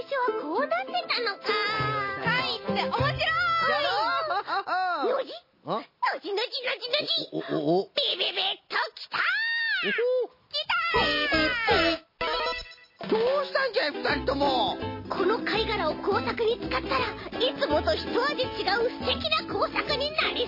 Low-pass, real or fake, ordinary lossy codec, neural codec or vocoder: 5.4 kHz; real; MP3, 24 kbps; none